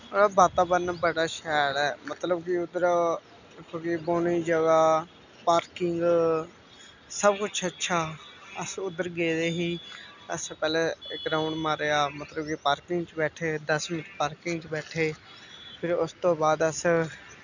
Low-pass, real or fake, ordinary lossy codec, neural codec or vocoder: 7.2 kHz; real; none; none